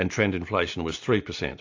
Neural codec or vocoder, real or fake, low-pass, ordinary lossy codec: none; real; 7.2 kHz; AAC, 48 kbps